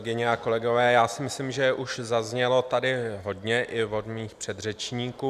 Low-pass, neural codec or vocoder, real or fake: 14.4 kHz; none; real